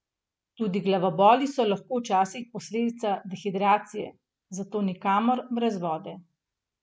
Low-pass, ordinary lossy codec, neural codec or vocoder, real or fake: none; none; none; real